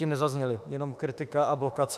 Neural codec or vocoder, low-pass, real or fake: autoencoder, 48 kHz, 32 numbers a frame, DAC-VAE, trained on Japanese speech; 14.4 kHz; fake